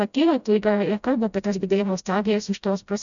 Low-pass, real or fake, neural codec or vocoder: 7.2 kHz; fake; codec, 16 kHz, 0.5 kbps, FreqCodec, smaller model